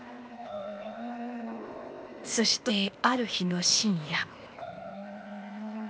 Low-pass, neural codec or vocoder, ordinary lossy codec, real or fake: none; codec, 16 kHz, 0.8 kbps, ZipCodec; none; fake